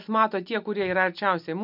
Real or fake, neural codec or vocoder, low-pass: real; none; 5.4 kHz